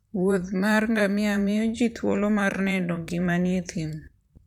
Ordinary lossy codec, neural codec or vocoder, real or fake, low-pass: none; vocoder, 44.1 kHz, 128 mel bands, Pupu-Vocoder; fake; 19.8 kHz